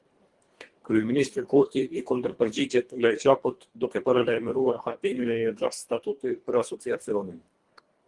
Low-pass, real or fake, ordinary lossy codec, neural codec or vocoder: 10.8 kHz; fake; Opus, 24 kbps; codec, 24 kHz, 1.5 kbps, HILCodec